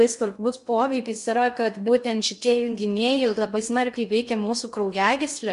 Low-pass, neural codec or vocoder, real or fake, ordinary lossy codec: 10.8 kHz; codec, 16 kHz in and 24 kHz out, 0.6 kbps, FocalCodec, streaming, 2048 codes; fake; MP3, 64 kbps